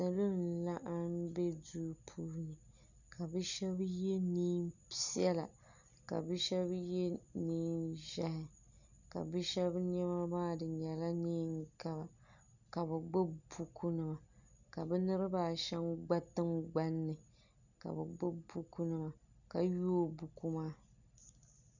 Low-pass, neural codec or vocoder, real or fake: 7.2 kHz; none; real